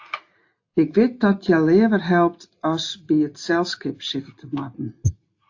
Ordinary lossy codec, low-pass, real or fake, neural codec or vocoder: AAC, 48 kbps; 7.2 kHz; real; none